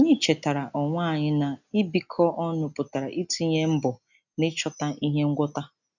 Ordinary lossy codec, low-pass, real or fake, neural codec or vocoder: none; 7.2 kHz; real; none